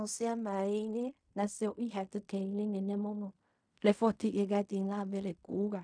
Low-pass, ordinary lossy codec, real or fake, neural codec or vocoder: 9.9 kHz; none; fake; codec, 16 kHz in and 24 kHz out, 0.4 kbps, LongCat-Audio-Codec, fine tuned four codebook decoder